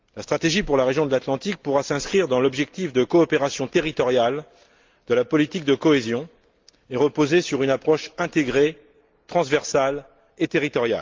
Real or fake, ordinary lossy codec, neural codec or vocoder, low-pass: real; Opus, 32 kbps; none; 7.2 kHz